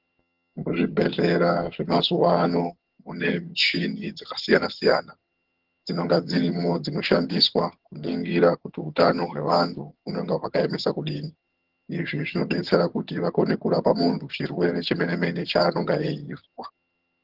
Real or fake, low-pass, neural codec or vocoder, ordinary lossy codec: fake; 5.4 kHz; vocoder, 22.05 kHz, 80 mel bands, HiFi-GAN; Opus, 16 kbps